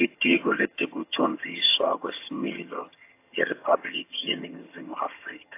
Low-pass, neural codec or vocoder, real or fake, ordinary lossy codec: 3.6 kHz; vocoder, 22.05 kHz, 80 mel bands, HiFi-GAN; fake; AAC, 24 kbps